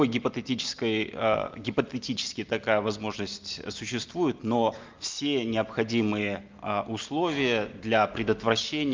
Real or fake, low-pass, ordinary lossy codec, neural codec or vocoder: real; 7.2 kHz; Opus, 24 kbps; none